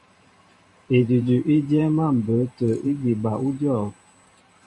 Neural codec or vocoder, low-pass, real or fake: vocoder, 44.1 kHz, 128 mel bands every 512 samples, BigVGAN v2; 10.8 kHz; fake